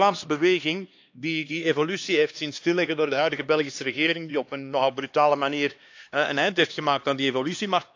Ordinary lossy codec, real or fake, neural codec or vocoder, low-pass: AAC, 48 kbps; fake; codec, 16 kHz, 2 kbps, X-Codec, HuBERT features, trained on LibriSpeech; 7.2 kHz